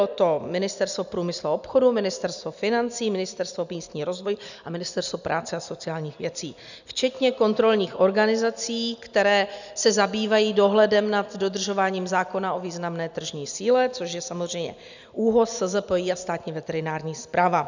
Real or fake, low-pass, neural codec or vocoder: real; 7.2 kHz; none